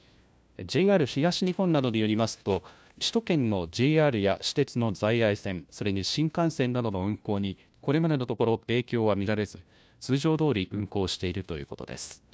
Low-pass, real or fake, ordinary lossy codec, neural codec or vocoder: none; fake; none; codec, 16 kHz, 1 kbps, FunCodec, trained on LibriTTS, 50 frames a second